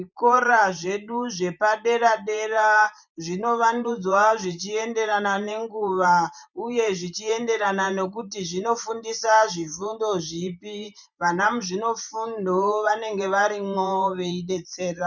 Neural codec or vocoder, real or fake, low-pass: vocoder, 44.1 kHz, 128 mel bands every 512 samples, BigVGAN v2; fake; 7.2 kHz